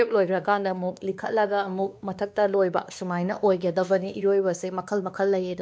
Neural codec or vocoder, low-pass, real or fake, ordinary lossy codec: codec, 16 kHz, 2 kbps, X-Codec, WavLM features, trained on Multilingual LibriSpeech; none; fake; none